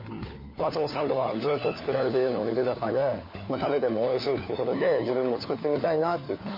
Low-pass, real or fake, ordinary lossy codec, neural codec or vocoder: 5.4 kHz; fake; MP3, 24 kbps; codec, 16 kHz, 4 kbps, FunCodec, trained on LibriTTS, 50 frames a second